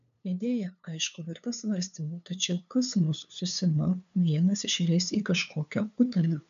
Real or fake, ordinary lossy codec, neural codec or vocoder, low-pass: fake; MP3, 64 kbps; codec, 16 kHz, 2 kbps, FunCodec, trained on LibriTTS, 25 frames a second; 7.2 kHz